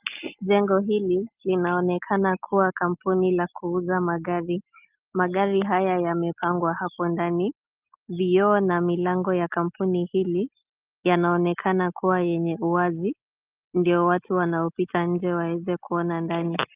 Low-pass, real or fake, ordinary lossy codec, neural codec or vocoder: 3.6 kHz; real; Opus, 32 kbps; none